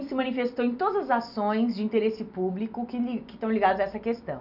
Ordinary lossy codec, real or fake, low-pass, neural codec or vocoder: none; real; 5.4 kHz; none